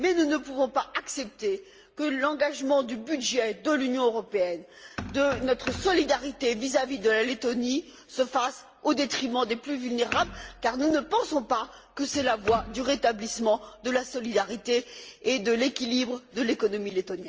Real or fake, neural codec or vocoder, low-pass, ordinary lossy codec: real; none; 7.2 kHz; Opus, 24 kbps